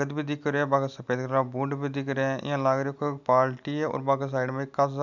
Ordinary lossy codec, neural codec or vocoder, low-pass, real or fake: none; none; 7.2 kHz; real